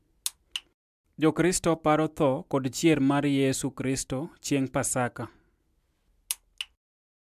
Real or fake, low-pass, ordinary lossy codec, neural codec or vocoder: real; 14.4 kHz; AAC, 96 kbps; none